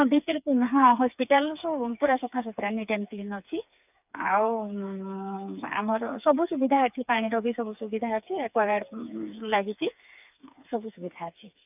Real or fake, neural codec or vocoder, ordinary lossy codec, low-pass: fake; codec, 16 kHz, 4 kbps, FreqCodec, smaller model; none; 3.6 kHz